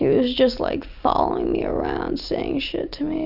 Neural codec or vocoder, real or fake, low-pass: none; real; 5.4 kHz